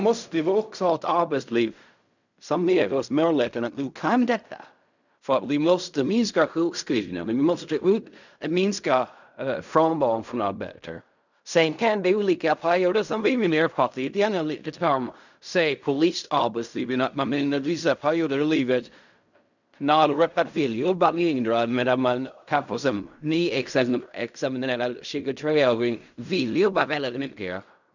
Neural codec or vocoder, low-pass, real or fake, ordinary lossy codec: codec, 16 kHz in and 24 kHz out, 0.4 kbps, LongCat-Audio-Codec, fine tuned four codebook decoder; 7.2 kHz; fake; none